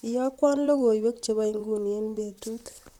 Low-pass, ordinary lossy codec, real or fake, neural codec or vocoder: 19.8 kHz; MP3, 96 kbps; fake; vocoder, 44.1 kHz, 128 mel bands, Pupu-Vocoder